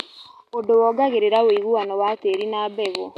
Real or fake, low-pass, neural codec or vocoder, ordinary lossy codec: real; 14.4 kHz; none; none